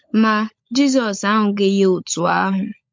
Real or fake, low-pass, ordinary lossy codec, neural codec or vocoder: fake; 7.2 kHz; MP3, 48 kbps; codec, 16 kHz, 16 kbps, FunCodec, trained on LibriTTS, 50 frames a second